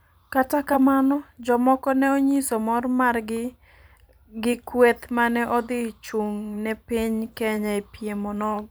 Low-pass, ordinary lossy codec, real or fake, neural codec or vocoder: none; none; fake; vocoder, 44.1 kHz, 128 mel bands every 512 samples, BigVGAN v2